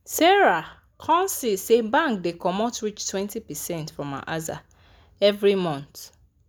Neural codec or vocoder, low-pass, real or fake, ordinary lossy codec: none; none; real; none